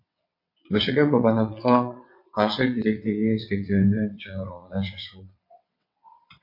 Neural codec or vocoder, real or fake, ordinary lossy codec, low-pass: vocoder, 22.05 kHz, 80 mel bands, WaveNeXt; fake; MP3, 32 kbps; 5.4 kHz